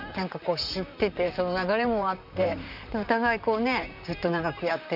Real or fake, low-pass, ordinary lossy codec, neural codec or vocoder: fake; 5.4 kHz; none; vocoder, 44.1 kHz, 128 mel bands, Pupu-Vocoder